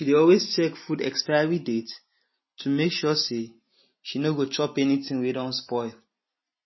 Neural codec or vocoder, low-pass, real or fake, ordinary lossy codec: none; 7.2 kHz; real; MP3, 24 kbps